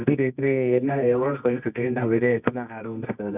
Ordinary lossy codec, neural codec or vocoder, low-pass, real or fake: none; codec, 24 kHz, 0.9 kbps, WavTokenizer, medium music audio release; 3.6 kHz; fake